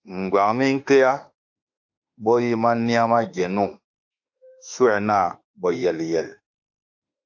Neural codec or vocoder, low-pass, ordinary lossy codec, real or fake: autoencoder, 48 kHz, 32 numbers a frame, DAC-VAE, trained on Japanese speech; 7.2 kHz; AAC, 48 kbps; fake